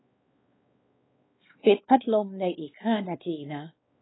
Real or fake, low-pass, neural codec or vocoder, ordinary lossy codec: fake; 7.2 kHz; codec, 16 kHz, 2 kbps, X-Codec, WavLM features, trained on Multilingual LibriSpeech; AAC, 16 kbps